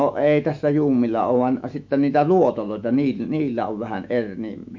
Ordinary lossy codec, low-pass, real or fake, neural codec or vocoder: MP3, 48 kbps; 7.2 kHz; fake; autoencoder, 48 kHz, 128 numbers a frame, DAC-VAE, trained on Japanese speech